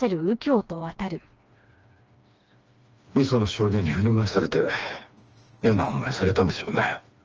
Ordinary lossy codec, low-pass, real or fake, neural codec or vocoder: Opus, 24 kbps; 7.2 kHz; fake; codec, 16 kHz, 2 kbps, FreqCodec, smaller model